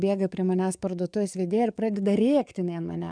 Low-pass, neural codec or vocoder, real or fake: 9.9 kHz; codec, 44.1 kHz, 7.8 kbps, DAC; fake